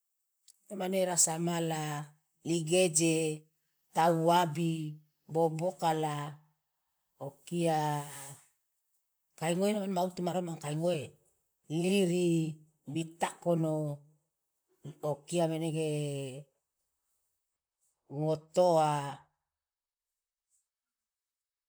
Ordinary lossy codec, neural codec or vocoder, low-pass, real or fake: none; vocoder, 44.1 kHz, 128 mel bands, Pupu-Vocoder; none; fake